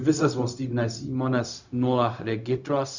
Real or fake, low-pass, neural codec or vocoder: fake; 7.2 kHz; codec, 16 kHz, 0.4 kbps, LongCat-Audio-Codec